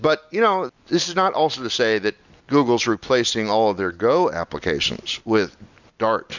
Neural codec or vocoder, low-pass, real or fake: none; 7.2 kHz; real